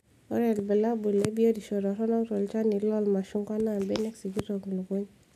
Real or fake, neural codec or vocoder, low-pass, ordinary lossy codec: real; none; 14.4 kHz; none